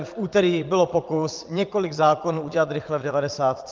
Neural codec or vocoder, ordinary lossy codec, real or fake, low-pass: vocoder, 22.05 kHz, 80 mel bands, Vocos; Opus, 24 kbps; fake; 7.2 kHz